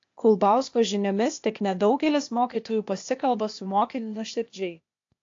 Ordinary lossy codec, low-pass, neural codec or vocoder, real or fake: AAC, 48 kbps; 7.2 kHz; codec, 16 kHz, 0.8 kbps, ZipCodec; fake